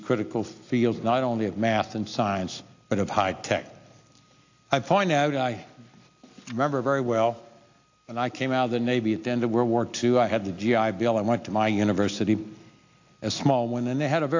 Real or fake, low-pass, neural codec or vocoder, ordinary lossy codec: real; 7.2 kHz; none; AAC, 48 kbps